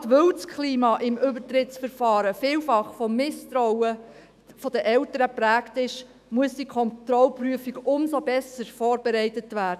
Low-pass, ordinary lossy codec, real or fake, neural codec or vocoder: 14.4 kHz; none; fake; autoencoder, 48 kHz, 128 numbers a frame, DAC-VAE, trained on Japanese speech